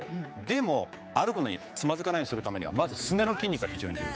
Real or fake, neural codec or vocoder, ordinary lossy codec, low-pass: fake; codec, 16 kHz, 4 kbps, X-Codec, HuBERT features, trained on general audio; none; none